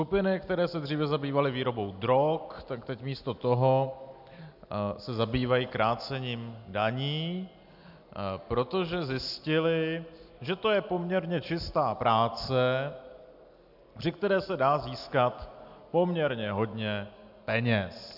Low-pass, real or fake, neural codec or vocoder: 5.4 kHz; real; none